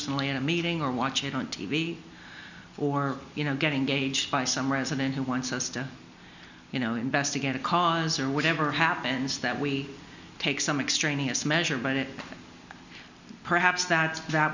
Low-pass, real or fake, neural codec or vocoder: 7.2 kHz; real; none